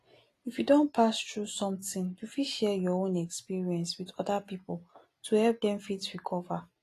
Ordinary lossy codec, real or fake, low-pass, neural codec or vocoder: AAC, 48 kbps; real; 14.4 kHz; none